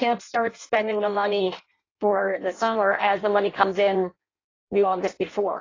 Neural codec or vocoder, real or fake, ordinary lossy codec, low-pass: codec, 16 kHz in and 24 kHz out, 0.6 kbps, FireRedTTS-2 codec; fake; AAC, 32 kbps; 7.2 kHz